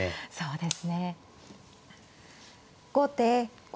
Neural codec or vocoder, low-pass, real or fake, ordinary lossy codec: none; none; real; none